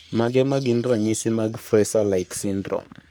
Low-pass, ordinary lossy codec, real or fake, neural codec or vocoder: none; none; fake; codec, 44.1 kHz, 3.4 kbps, Pupu-Codec